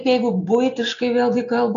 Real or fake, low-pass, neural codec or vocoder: real; 7.2 kHz; none